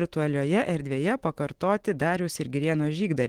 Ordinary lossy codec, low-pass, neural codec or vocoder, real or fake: Opus, 16 kbps; 19.8 kHz; autoencoder, 48 kHz, 128 numbers a frame, DAC-VAE, trained on Japanese speech; fake